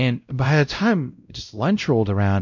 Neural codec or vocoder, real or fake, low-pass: codec, 16 kHz, 0.5 kbps, X-Codec, WavLM features, trained on Multilingual LibriSpeech; fake; 7.2 kHz